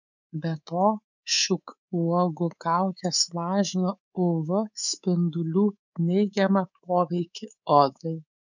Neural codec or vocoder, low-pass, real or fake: codec, 24 kHz, 3.1 kbps, DualCodec; 7.2 kHz; fake